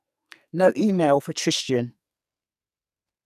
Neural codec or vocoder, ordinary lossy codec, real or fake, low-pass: codec, 44.1 kHz, 2.6 kbps, SNAC; none; fake; 14.4 kHz